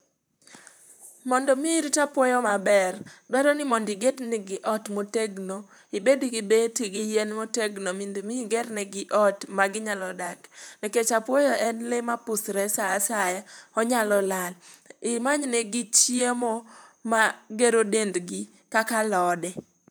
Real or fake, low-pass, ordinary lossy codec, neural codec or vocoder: fake; none; none; vocoder, 44.1 kHz, 128 mel bands, Pupu-Vocoder